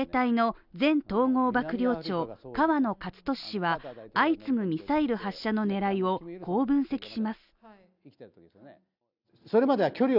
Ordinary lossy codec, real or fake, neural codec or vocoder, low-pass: none; real; none; 5.4 kHz